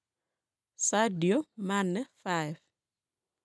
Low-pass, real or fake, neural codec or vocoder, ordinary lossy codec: none; real; none; none